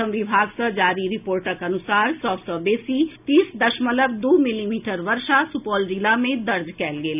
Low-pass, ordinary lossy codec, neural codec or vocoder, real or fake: 3.6 kHz; none; none; real